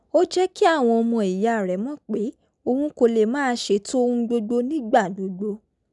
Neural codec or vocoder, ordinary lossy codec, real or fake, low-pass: none; none; real; 10.8 kHz